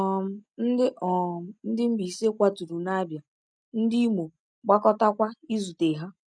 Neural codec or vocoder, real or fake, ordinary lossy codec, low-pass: none; real; none; 9.9 kHz